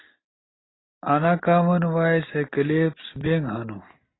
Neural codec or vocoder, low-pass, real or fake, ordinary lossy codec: none; 7.2 kHz; real; AAC, 16 kbps